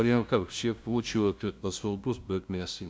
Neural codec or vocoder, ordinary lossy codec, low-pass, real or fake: codec, 16 kHz, 0.5 kbps, FunCodec, trained on LibriTTS, 25 frames a second; none; none; fake